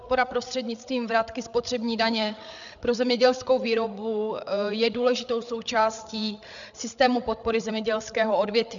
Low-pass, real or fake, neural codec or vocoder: 7.2 kHz; fake; codec, 16 kHz, 8 kbps, FreqCodec, larger model